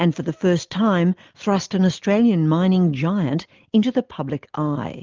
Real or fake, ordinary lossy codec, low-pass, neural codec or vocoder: real; Opus, 32 kbps; 7.2 kHz; none